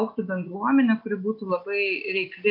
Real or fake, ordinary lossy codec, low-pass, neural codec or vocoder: real; MP3, 48 kbps; 5.4 kHz; none